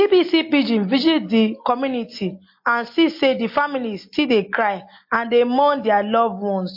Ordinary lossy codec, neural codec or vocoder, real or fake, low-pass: MP3, 32 kbps; none; real; 5.4 kHz